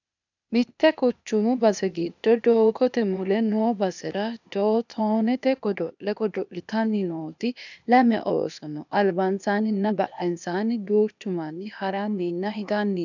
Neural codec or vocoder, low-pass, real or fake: codec, 16 kHz, 0.8 kbps, ZipCodec; 7.2 kHz; fake